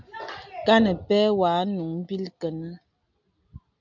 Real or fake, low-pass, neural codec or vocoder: real; 7.2 kHz; none